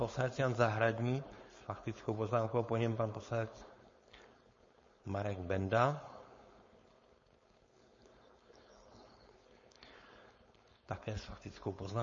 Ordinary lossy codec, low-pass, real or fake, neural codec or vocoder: MP3, 32 kbps; 7.2 kHz; fake; codec, 16 kHz, 4.8 kbps, FACodec